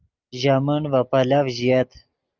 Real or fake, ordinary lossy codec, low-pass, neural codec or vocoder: real; Opus, 24 kbps; 7.2 kHz; none